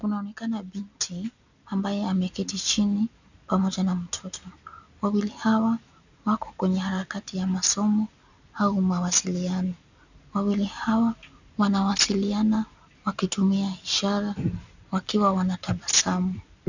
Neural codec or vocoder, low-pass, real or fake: none; 7.2 kHz; real